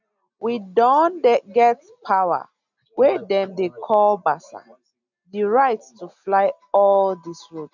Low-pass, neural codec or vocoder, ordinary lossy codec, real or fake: 7.2 kHz; none; none; real